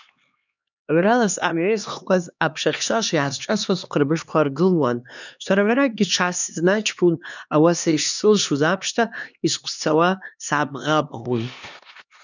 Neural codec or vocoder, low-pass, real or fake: codec, 16 kHz, 2 kbps, X-Codec, HuBERT features, trained on LibriSpeech; 7.2 kHz; fake